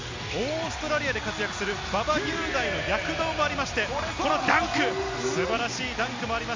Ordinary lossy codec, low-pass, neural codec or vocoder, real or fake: MP3, 64 kbps; 7.2 kHz; none; real